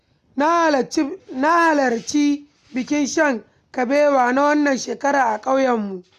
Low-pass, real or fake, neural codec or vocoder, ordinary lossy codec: 14.4 kHz; real; none; none